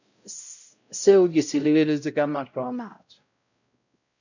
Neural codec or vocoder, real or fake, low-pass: codec, 16 kHz, 0.5 kbps, X-Codec, WavLM features, trained on Multilingual LibriSpeech; fake; 7.2 kHz